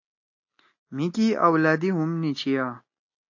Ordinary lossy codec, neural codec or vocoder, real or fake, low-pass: AAC, 48 kbps; none; real; 7.2 kHz